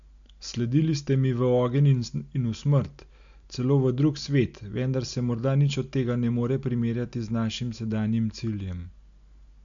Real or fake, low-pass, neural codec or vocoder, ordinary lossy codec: real; 7.2 kHz; none; MP3, 64 kbps